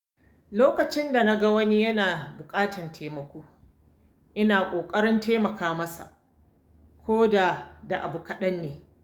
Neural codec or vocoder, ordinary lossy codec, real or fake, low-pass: codec, 44.1 kHz, 7.8 kbps, DAC; Opus, 64 kbps; fake; 19.8 kHz